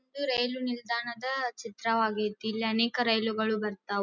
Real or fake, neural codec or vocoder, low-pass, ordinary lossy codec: real; none; 7.2 kHz; none